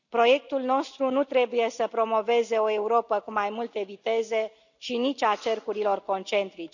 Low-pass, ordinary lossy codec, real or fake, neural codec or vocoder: 7.2 kHz; none; real; none